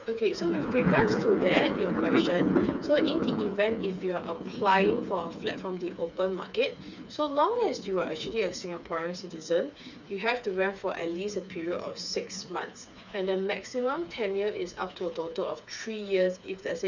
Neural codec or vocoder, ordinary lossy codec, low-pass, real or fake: codec, 16 kHz, 4 kbps, FreqCodec, smaller model; none; 7.2 kHz; fake